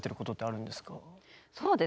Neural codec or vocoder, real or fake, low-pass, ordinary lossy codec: none; real; none; none